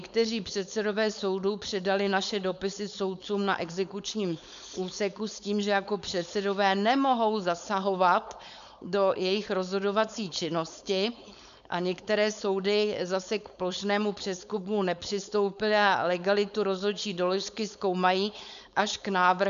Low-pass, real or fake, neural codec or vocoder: 7.2 kHz; fake; codec, 16 kHz, 4.8 kbps, FACodec